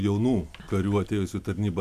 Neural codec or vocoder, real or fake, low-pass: none; real; 14.4 kHz